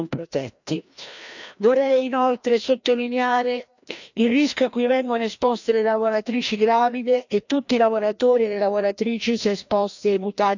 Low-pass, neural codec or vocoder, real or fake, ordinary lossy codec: 7.2 kHz; codec, 16 kHz, 1 kbps, FreqCodec, larger model; fake; none